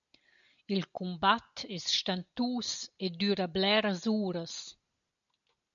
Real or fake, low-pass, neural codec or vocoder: real; 7.2 kHz; none